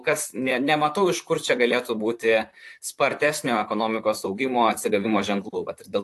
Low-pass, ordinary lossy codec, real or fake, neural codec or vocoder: 14.4 kHz; AAC, 64 kbps; fake; vocoder, 44.1 kHz, 128 mel bands, Pupu-Vocoder